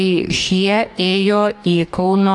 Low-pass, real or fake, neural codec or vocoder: 10.8 kHz; fake; codec, 44.1 kHz, 2.6 kbps, DAC